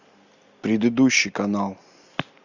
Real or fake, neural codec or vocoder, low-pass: real; none; 7.2 kHz